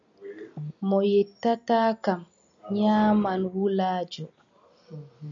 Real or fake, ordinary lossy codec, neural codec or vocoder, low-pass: real; MP3, 96 kbps; none; 7.2 kHz